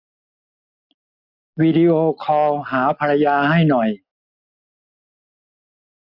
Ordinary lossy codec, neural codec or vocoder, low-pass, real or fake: MP3, 48 kbps; none; 5.4 kHz; real